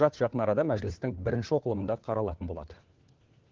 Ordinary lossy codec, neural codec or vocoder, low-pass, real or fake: Opus, 16 kbps; codec, 16 kHz, 4 kbps, FunCodec, trained on LibriTTS, 50 frames a second; 7.2 kHz; fake